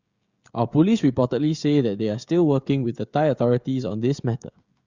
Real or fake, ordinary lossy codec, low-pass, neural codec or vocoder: fake; Opus, 64 kbps; 7.2 kHz; codec, 16 kHz, 16 kbps, FreqCodec, smaller model